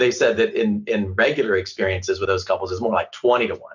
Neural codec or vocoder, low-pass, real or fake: none; 7.2 kHz; real